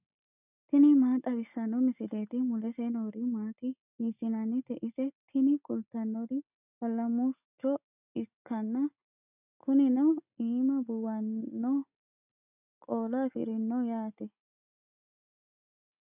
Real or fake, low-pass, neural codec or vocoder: real; 3.6 kHz; none